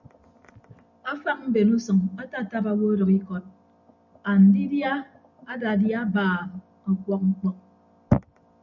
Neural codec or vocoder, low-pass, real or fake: none; 7.2 kHz; real